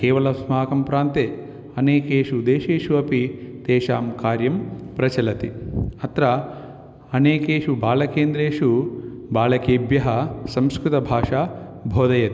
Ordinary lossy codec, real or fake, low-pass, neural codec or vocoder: none; real; none; none